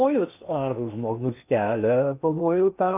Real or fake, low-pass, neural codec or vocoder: fake; 3.6 kHz; codec, 16 kHz in and 24 kHz out, 0.8 kbps, FocalCodec, streaming, 65536 codes